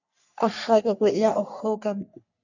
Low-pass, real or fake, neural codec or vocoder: 7.2 kHz; fake; codec, 44.1 kHz, 3.4 kbps, Pupu-Codec